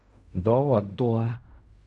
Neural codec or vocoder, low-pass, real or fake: codec, 16 kHz in and 24 kHz out, 0.4 kbps, LongCat-Audio-Codec, fine tuned four codebook decoder; 10.8 kHz; fake